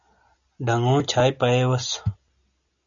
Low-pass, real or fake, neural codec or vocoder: 7.2 kHz; real; none